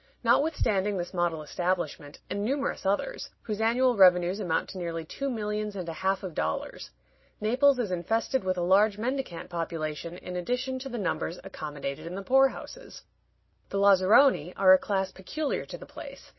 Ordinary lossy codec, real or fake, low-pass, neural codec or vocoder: MP3, 24 kbps; real; 7.2 kHz; none